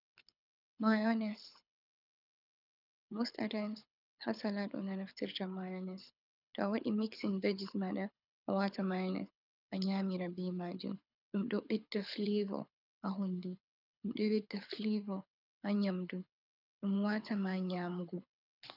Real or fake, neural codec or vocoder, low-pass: fake; codec, 24 kHz, 6 kbps, HILCodec; 5.4 kHz